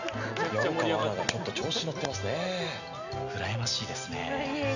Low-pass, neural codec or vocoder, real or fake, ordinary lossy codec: 7.2 kHz; none; real; none